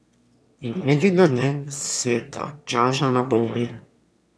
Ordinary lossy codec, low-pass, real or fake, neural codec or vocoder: none; none; fake; autoencoder, 22.05 kHz, a latent of 192 numbers a frame, VITS, trained on one speaker